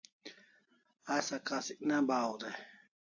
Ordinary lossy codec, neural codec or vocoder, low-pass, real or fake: AAC, 48 kbps; none; 7.2 kHz; real